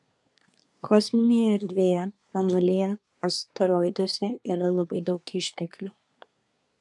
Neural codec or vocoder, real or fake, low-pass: codec, 24 kHz, 1 kbps, SNAC; fake; 10.8 kHz